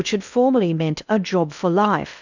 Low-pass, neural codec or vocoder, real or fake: 7.2 kHz; codec, 16 kHz in and 24 kHz out, 0.8 kbps, FocalCodec, streaming, 65536 codes; fake